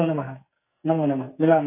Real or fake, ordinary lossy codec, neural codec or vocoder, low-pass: fake; AAC, 24 kbps; codec, 44.1 kHz, 2.6 kbps, SNAC; 3.6 kHz